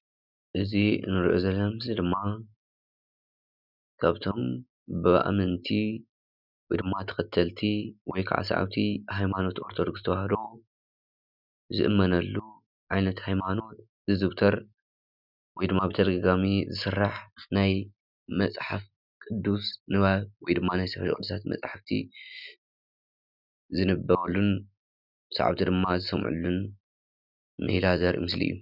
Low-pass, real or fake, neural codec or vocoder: 5.4 kHz; real; none